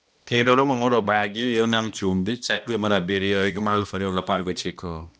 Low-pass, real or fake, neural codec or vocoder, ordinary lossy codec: none; fake; codec, 16 kHz, 1 kbps, X-Codec, HuBERT features, trained on balanced general audio; none